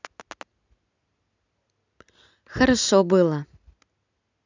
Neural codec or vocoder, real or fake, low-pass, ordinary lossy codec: none; real; 7.2 kHz; none